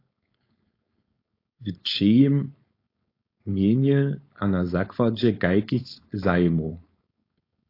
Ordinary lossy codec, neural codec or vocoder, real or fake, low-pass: AAC, 32 kbps; codec, 16 kHz, 4.8 kbps, FACodec; fake; 5.4 kHz